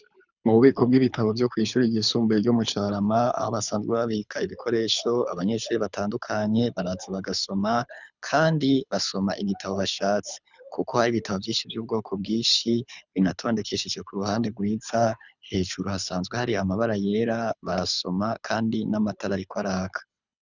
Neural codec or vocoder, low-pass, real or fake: codec, 24 kHz, 6 kbps, HILCodec; 7.2 kHz; fake